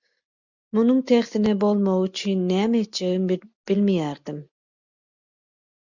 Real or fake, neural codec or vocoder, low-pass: real; none; 7.2 kHz